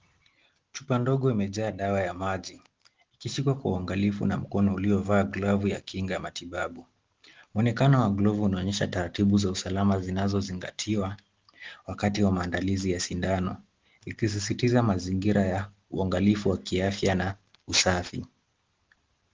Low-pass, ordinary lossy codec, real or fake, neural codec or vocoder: 7.2 kHz; Opus, 16 kbps; real; none